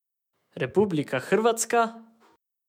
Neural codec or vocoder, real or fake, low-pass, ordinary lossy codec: autoencoder, 48 kHz, 128 numbers a frame, DAC-VAE, trained on Japanese speech; fake; 19.8 kHz; MP3, 96 kbps